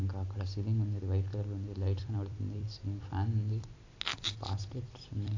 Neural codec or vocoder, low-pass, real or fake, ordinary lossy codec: vocoder, 44.1 kHz, 128 mel bands every 256 samples, BigVGAN v2; 7.2 kHz; fake; AAC, 48 kbps